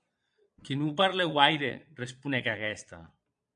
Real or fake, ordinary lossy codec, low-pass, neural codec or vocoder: fake; MP3, 96 kbps; 9.9 kHz; vocoder, 22.05 kHz, 80 mel bands, Vocos